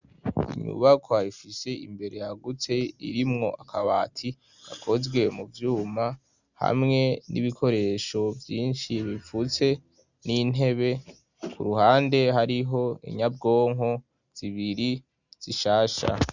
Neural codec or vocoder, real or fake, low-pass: none; real; 7.2 kHz